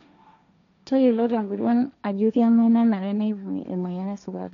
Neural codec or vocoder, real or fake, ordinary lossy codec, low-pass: codec, 16 kHz, 1.1 kbps, Voila-Tokenizer; fake; none; 7.2 kHz